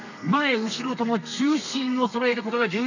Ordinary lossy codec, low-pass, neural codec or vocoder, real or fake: none; 7.2 kHz; codec, 32 kHz, 1.9 kbps, SNAC; fake